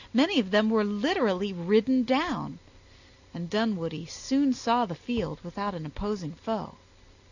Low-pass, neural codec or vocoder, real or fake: 7.2 kHz; none; real